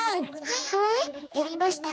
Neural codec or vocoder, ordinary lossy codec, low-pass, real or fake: codec, 16 kHz, 2 kbps, X-Codec, HuBERT features, trained on general audio; none; none; fake